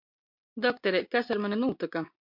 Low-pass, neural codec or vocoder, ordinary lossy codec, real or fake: 5.4 kHz; none; MP3, 48 kbps; real